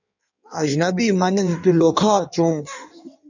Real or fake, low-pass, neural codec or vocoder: fake; 7.2 kHz; codec, 16 kHz in and 24 kHz out, 1.1 kbps, FireRedTTS-2 codec